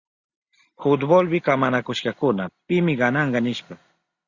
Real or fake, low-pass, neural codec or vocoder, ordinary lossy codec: real; 7.2 kHz; none; Opus, 64 kbps